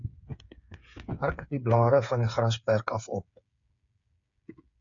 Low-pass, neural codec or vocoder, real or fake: 7.2 kHz; codec, 16 kHz, 8 kbps, FreqCodec, smaller model; fake